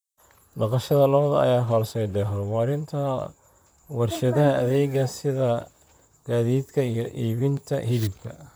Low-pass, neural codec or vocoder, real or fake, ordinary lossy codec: none; vocoder, 44.1 kHz, 128 mel bands, Pupu-Vocoder; fake; none